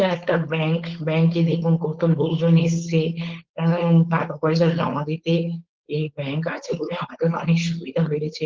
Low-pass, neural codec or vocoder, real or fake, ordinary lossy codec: 7.2 kHz; codec, 16 kHz, 4.8 kbps, FACodec; fake; Opus, 16 kbps